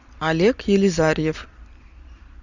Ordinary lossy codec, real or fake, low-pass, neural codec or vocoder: Opus, 64 kbps; real; 7.2 kHz; none